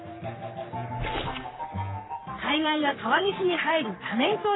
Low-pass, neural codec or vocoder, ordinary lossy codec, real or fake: 7.2 kHz; codec, 44.1 kHz, 3.4 kbps, Pupu-Codec; AAC, 16 kbps; fake